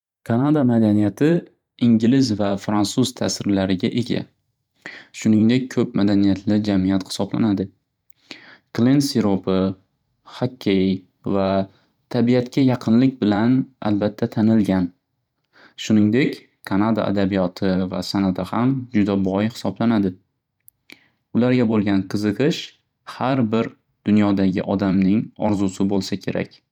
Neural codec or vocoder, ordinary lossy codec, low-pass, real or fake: vocoder, 44.1 kHz, 128 mel bands every 512 samples, BigVGAN v2; none; 19.8 kHz; fake